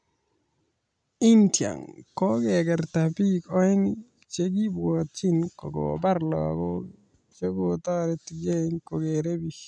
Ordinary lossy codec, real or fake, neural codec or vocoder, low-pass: none; real; none; 9.9 kHz